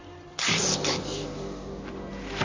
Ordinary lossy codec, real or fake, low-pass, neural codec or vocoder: MP3, 64 kbps; fake; 7.2 kHz; vocoder, 44.1 kHz, 128 mel bands, Pupu-Vocoder